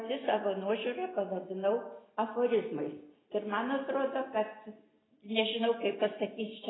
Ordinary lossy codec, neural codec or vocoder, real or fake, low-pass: AAC, 16 kbps; none; real; 7.2 kHz